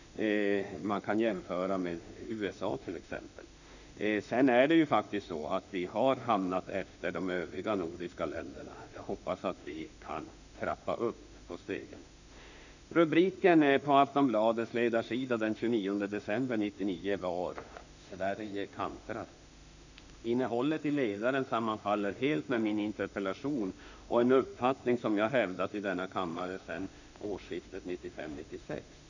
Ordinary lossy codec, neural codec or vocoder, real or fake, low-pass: none; autoencoder, 48 kHz, 32 numbers a frame, DAC-VAE, trained on Japanese speech; fake; 7.2 kHz